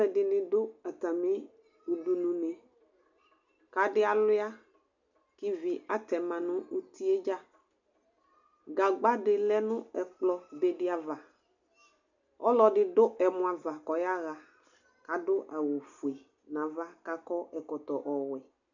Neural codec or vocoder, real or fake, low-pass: none; real; 7.2 kHz